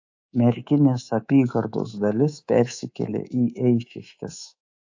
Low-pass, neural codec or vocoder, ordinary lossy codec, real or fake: 7.2 kHz; codec, 24 kHz, 3.1 kbps, DualCodec; AAC, 48 kbps; fake